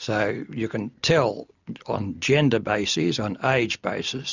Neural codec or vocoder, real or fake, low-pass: none; real; 7.2 kHz